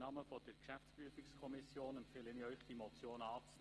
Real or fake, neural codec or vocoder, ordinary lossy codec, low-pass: real; none; Opus, 16 kbps; 10.8 kHz